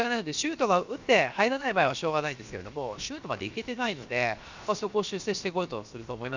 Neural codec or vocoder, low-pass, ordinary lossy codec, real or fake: codec, 16 kHz, about 1 kbps, DyCAST, with the encoder's durations; 7.2 kHz; Opus, 64 kbps; fake